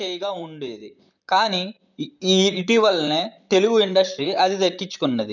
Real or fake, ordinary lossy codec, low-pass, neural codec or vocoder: fake; none; 7.2 kHz; vocoder, 44.1 kHz, 128 mel bands, Pupu-Vocoder